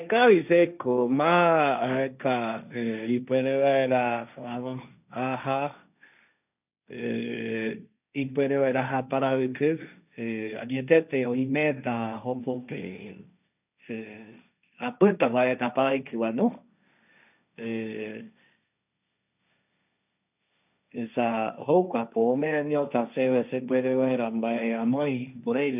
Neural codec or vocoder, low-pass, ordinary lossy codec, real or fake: codec, 16 kHz, 1.1 kbps, Voila-Tokenizer; 3.6 kHz; none; fake